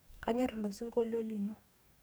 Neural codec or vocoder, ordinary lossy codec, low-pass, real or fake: codec, 44.1 kHz, 2.6 kbps, DAC; none; none; fake